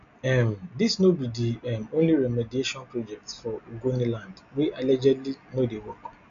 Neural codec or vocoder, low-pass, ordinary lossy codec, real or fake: none; 7.2 kHz; none; real